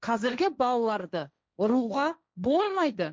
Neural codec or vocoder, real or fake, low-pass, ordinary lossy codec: codec, 16 kHz, 1.1 kbps, Voila-Tokenizer; fake; none; none